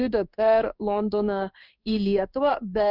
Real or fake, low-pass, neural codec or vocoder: fake; 5.4 kHz; codec, 16 kHz in and 24 kHz out, 1 kbps, XY-Tokenizer